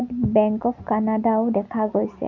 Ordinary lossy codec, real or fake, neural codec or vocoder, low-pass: none; real; none; 7.2 kHz